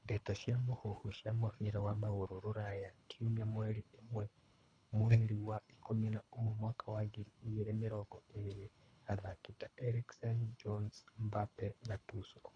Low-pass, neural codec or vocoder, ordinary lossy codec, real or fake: 9.9 kHz; codec, 24 kHz, 3 kbps, HILCodec; none; fake